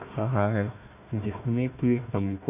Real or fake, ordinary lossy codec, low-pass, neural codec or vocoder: fake; none; 3.6 kHz; codec, 16 kHz, 1 kbps, FunCodec, trained on Chinese and English, 50 frames a second